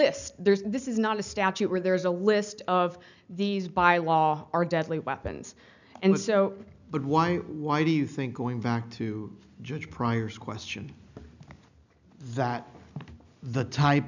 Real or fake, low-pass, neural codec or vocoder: real; 7.2 kHz; none